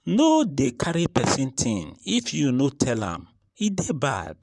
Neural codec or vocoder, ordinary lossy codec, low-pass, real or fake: vocoder, 44.1 kHz, 128 mel bands, Pupu-Vocoder; none; 10.8 kHz; fake